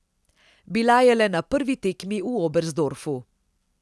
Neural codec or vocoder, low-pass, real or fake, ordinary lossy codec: none; none; real; none